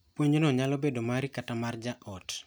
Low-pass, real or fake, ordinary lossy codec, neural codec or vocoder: none; real; none; none